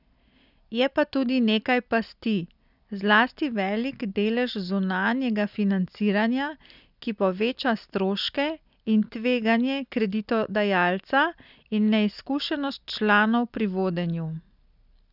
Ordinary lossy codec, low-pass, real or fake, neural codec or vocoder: none; 5.4 kHz; real; none